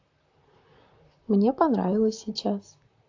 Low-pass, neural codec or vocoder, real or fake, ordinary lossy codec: 7.2 kHz; vocoder, 44.1 kHz, 128 mel bands, Pupu-Vocoder; fake; AAC, 48 kbps